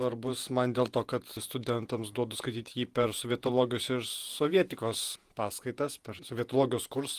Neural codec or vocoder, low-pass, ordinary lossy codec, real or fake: vocoder, 48 kHz, 128 mel bands, Vocos; 14.4 kHz; Opus, 24 kbps; fake